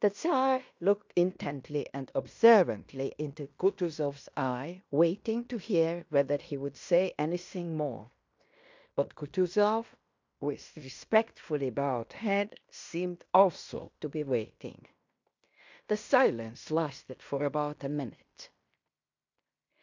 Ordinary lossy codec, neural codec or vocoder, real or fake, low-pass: MP3, 64 kbps; codec, 16 kHz in and 24 kHz out, 0.9 kbps, LongCat-Audio-Codec, fine tuned four codebook decoder; fake; 7.2 kHz